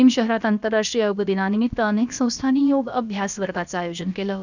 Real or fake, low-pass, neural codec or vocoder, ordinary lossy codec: fake; 7.2 kHz; codec, 16 kHz, about 1 kbps, DyCAST, with the encoder's durations; none